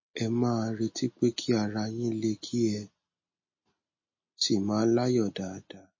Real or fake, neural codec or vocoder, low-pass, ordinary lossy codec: real; none; 7.2 kHz; MP3, 32 kbps